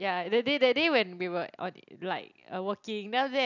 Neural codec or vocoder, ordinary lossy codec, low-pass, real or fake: none; none; 7.2 kHz; real